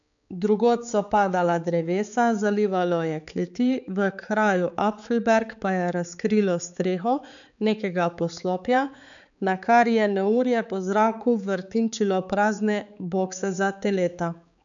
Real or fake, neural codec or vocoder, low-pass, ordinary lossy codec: fake; codec, 16 kHz, 4 kbps, X-Codec, HuBERT features, trained on balanced general audio; 7.2 kHz; none